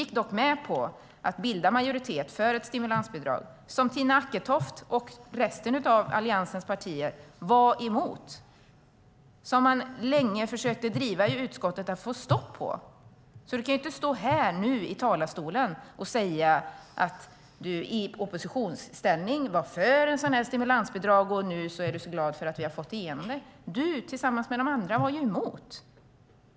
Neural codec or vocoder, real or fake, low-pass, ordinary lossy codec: none; real; none; none